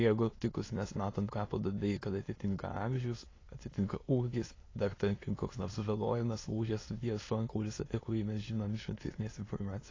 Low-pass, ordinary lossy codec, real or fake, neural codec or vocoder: 7.2 kHz; AAC, 32 kbps; fake; autoencoder, 22.05 kHz, a latent of 192 numbers a frame, VITS, trained on many speakers